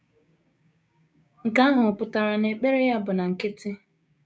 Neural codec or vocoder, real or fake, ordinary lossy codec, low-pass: codec, 16 kHz, 6 kbps, DAC; fake; none; none